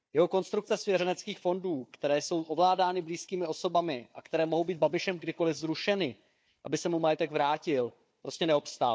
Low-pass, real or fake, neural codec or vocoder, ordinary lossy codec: none; fake; codec, 16 kHz, 4 kbps, FunCodec, trained on Chinese and English, 50 frames a second; none